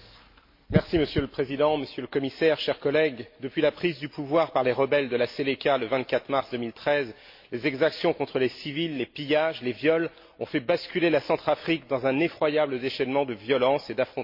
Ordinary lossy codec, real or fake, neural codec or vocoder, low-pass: MP3, 32 kbps; real; none; 5.4 kHz